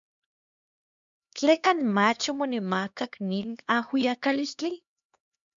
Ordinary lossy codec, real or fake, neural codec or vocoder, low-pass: MP3, 64 kbps; fake; codec, 16 kHz, 2 kbps, X-Codec, HuBERT features, trained on LibriSpeech; 7.2 kHz